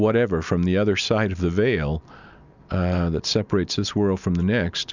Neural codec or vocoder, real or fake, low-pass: none; real; 7.2 kHz